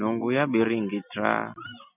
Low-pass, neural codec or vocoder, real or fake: 3.6 kHz; none; real